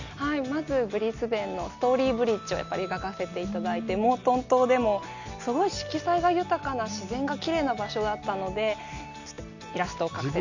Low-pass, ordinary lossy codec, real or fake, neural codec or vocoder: 7.2 kHz; none; real; none